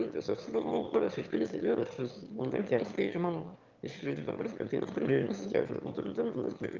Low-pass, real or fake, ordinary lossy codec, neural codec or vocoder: 7.2 kHz; fake; Opus, 32 kbps; autoencoder, 22.05 kHz, a latent of 192 numbers a frame, VITS, trained on one speaker